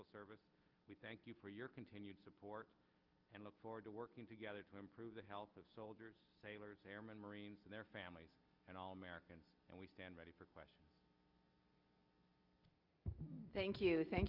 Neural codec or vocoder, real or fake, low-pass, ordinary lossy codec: none; real; 5.4 kHz; Opus, 32 kbps